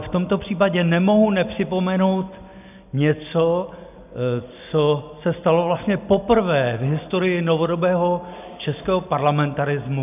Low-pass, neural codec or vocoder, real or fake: 3.6 kHz; none; real